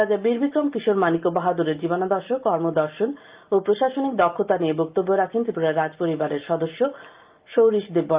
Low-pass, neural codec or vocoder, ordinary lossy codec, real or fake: 3.6 kHz; none; Opus, 32 kbps; real